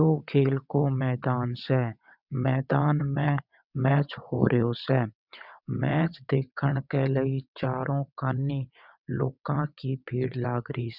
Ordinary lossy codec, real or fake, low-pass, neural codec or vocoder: none; fake; 5.4 kHz; vocoder, 22.05 kHz, 80 mel bands, WaveNeXt